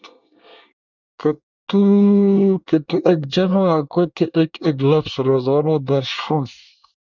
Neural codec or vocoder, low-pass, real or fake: codec, 24 kHz, 1 kbps, SNAC; 7.2 kHz; fake